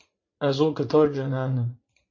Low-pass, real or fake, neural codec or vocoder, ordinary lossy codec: 7.2 kHz; fake; vocoder, 44.1 kHz, 80 mel bands, Vocos; MP3, 32 kbps